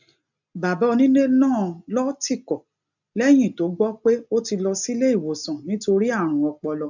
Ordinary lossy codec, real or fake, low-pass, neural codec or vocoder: none; real; 7.2 kHz; none